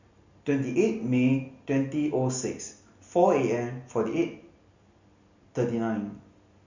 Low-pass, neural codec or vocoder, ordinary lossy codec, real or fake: 7.2 kHz; none; Opus, 64 kbps; real